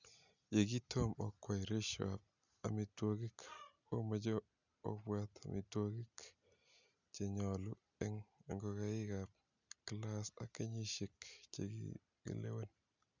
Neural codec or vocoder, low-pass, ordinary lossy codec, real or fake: none; 7.2 kHz; none; real